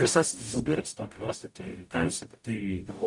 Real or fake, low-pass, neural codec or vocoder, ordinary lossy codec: fake; 10.8 kHz; codec, 44.1 kHz, 0.9 kbps, DAC; MP3, 96 kbps